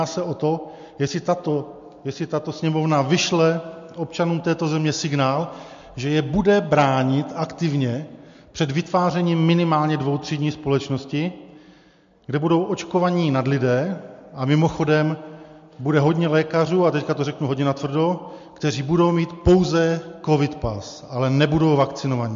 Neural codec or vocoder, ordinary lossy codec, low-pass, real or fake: none; MP3, 48 kbps; 7.2 kHz; real